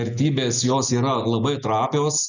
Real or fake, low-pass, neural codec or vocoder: real; 7.2 kHz; none